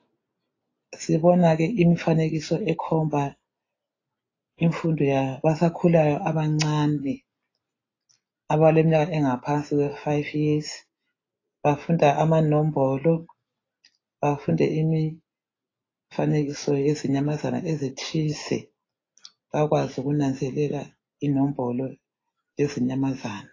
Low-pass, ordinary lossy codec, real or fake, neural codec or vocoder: 7.2 kHz; AAC, 32 kbps; real; none